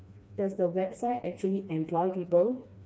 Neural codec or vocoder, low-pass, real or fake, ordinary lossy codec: codec, 16 kHz, 2 kbps, FreqCodec, smaller model; none; fake; none